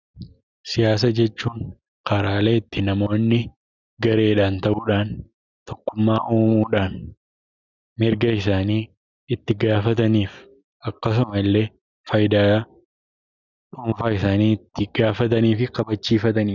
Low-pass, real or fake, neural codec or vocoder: 7.2 kHz; real; none